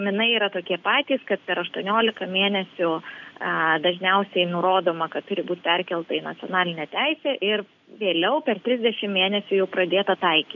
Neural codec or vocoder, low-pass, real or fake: none; 7.2 kHz; real